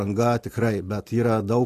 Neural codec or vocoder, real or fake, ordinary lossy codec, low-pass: none; real; AAC, 48 kbps; 14.4 kHz